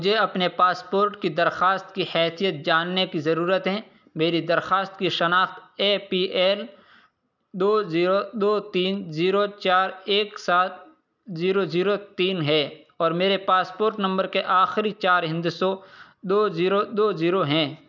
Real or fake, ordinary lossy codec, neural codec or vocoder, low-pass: real; none; none; 7.2 kHz